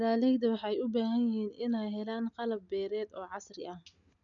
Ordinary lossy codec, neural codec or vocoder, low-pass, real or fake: none; none; 7.2 kHz; real